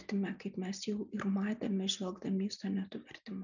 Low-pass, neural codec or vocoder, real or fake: 7.2 kHz; none; real